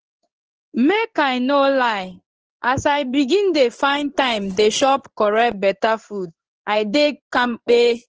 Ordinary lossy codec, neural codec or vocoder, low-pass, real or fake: Opus, 16 kbps; codec, 16 kHz in and 24 kHz out, 1 kbps, XY-Tokenizer; 7.2 kHz; fake